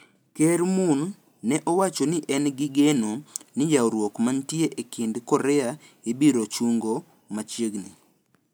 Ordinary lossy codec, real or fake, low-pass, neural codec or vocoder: none; real; none; none